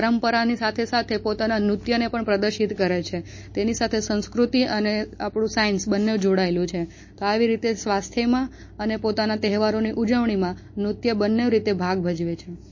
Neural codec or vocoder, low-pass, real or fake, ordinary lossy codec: none; 7.2 kHz; real; MP3, 32 kbps